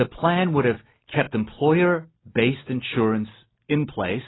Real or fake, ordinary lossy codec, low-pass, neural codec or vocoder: real; AAC, 16 kbps; 7.2 kHz; none